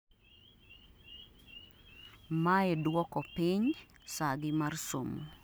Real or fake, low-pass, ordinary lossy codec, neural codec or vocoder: real; none; none; none